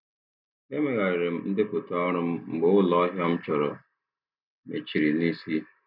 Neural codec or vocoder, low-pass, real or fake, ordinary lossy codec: none; 5.4 kHz; real; none